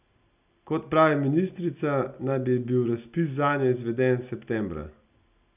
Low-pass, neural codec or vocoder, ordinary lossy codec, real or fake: 3.6 kHz; none; none; real